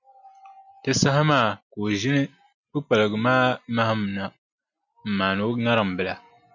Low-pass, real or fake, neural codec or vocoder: 7.2 kHz; real; none